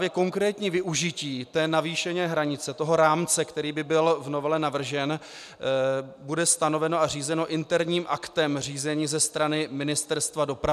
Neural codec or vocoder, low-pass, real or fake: none; 14.4 kHz; real